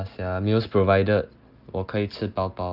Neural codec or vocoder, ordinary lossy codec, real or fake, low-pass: none; Opus, 24 kbps; real; 5.4 kHz